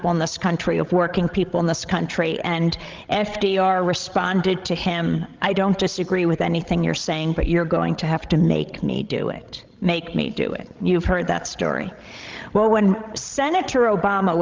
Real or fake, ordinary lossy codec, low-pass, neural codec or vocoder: fake; Opus, 32 kbps; 7.2 kHz; codec, 16 kHz, 16 kbps, FreqCodec, larger model